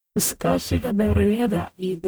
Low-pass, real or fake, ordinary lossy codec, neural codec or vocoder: none; fake; none; codec, 44.1 kHz, 0.9 kbps, DAC